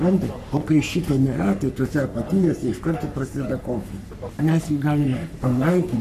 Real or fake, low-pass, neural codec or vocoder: fake; 14.4 kHz; codec, 44.1 kHz, 3.4 kbps, Pupu-Codec